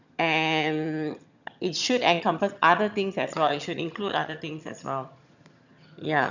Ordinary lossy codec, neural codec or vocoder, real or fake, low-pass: none; vocoder, 22.05 kHz, 80 mel bands, HiFi-GAN; fake; 7.2 kHz